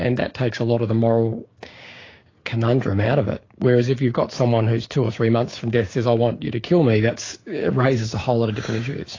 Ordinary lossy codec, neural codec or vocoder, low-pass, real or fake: AAC, 32 kbps; codec, 16 kHz, 6 kbps, DAC; 7.2 kHz; fake